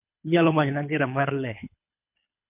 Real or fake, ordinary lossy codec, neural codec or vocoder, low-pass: fake; MP3, 32 kbps; codec, 24 kHz, 3 kbps, HILCodec; 3.6 kHz